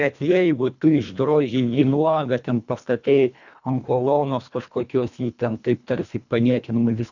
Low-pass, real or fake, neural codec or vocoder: 7.2 kHz; fake; codec, 24 kHz, 1.5 kbps, HILCodec